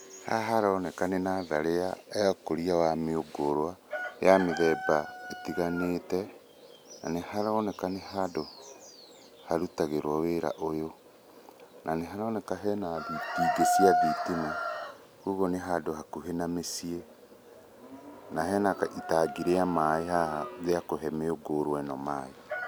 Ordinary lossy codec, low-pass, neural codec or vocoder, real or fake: none; none; none; real